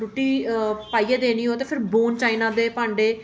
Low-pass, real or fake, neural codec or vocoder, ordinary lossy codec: none; real; none; none